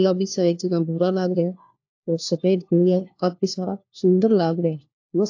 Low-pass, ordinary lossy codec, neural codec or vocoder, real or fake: 7.2 kHz; AAC, 48 kbps; codec, 16 kHz, 1 kbps, FunCodec, trained on LibriTTS, 50 frames a second; fake